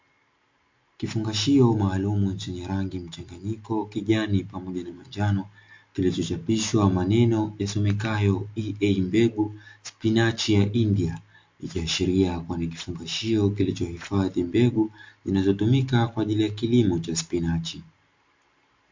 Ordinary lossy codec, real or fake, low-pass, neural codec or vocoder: MP3, 48 kbps; real; 7.2 kHz; none